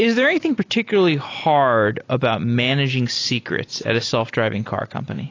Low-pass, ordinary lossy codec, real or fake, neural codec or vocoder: 7.2 kHz; AAC, 32 kbps; real; none